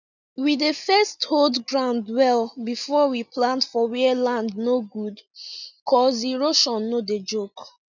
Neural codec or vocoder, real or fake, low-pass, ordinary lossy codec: none; real; 7.2 kHz; none